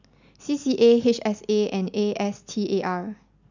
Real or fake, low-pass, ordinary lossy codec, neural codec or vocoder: real; 7.2 kHz; none; none